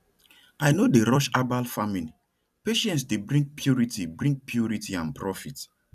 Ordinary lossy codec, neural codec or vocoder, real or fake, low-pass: none; vocoder, 44.1 kHz, 128 mel bands every 256 samples, BigVGAN v2; fake; 14.4 kHz